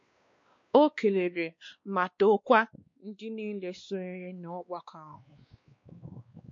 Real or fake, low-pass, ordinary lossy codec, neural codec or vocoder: fake; 7.2 kHz; MP3, 48 kbps; codec, 16 kHz, 2 kbps, X-Codec, WavLM features, trained on Multilingual LibriSpeech